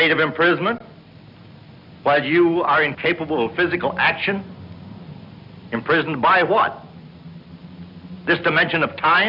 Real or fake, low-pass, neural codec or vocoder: real; 5.4 kHz; none